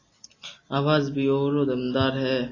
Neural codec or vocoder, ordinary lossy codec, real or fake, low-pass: none; AAC, 32 kbps; real; 7.2 kHz